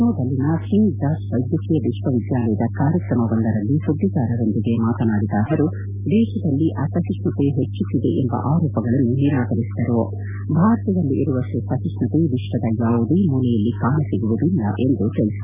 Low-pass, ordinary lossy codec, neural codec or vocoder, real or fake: 3.6 kHz; none; none; real